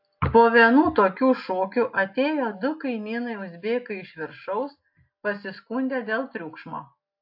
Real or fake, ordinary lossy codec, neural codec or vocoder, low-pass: real; AAC, 48 kbps; none; 5.4 kHz